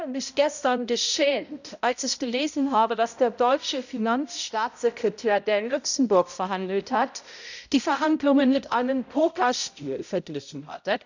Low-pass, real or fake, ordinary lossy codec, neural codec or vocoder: 7.2 kHz; fake; none; codec, 16 kHz, 0.5 kbps, X-Codec, HuBERT features, trained on balanced general audio